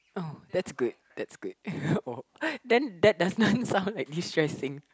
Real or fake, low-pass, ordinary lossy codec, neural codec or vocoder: real; none; none; none